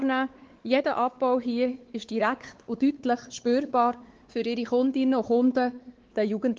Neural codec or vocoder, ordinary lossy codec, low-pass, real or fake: codec, 16 kHz, 4 kbps, X-Codec, WavLM features, trained on Multilingual LibriSpeech; Opus, 16 kbps; 7.2 kHz; fake